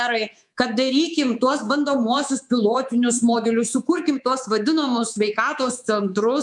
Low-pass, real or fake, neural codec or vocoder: 10.8 kHz; fake; codec, 24 kHz, 3.1 kbps, DualCodec